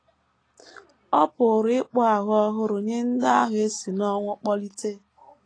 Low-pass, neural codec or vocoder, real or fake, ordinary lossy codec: 9.9 kHz; none; real; AAC, 32 kbps